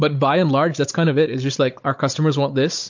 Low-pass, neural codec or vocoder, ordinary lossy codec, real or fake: 7.2 kHz; codec, 16 kHz, 16 kbps, FunCodec, trained on Chinese and English, 50 frames a second; MP3, 48 kbps; fake